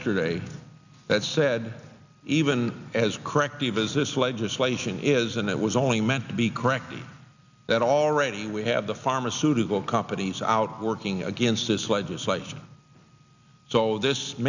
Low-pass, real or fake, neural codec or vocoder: 7.2 kHz; real; none